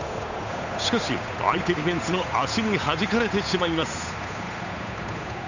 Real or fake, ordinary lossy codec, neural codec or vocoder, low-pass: fake; none; codec, 16 kHz, 8 kbps, FunCodec, trained on Chinese and English, 25 frames a second; 7.2 kHz